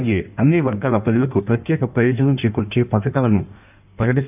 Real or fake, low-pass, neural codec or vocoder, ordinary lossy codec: fake; 3.6 kHz; codec, 16 kHz in and 24 kHz out, 1.1 kbps, FireRedTTS-2 codec; none